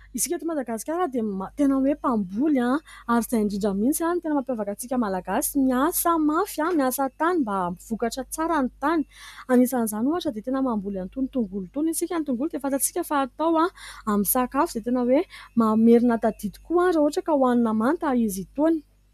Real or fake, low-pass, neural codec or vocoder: real; 14.4 kHz; none